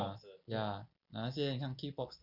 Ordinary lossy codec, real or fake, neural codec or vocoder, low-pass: none; real; none; 5.4 kHz